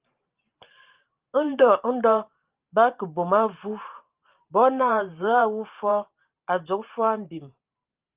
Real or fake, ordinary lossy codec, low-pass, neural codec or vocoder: fake; Opus, 32 kbps; 3.6 kHz; vocoder, 44.1 kHz, 128 mel bands every 512 samples, BigVGAN v2